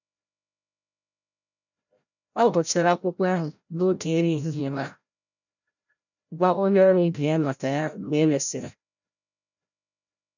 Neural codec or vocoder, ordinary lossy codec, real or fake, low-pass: codec, 16 kHz, 0.5 kbps, FreqCodec, larger model; none; fake; 7.2 kHz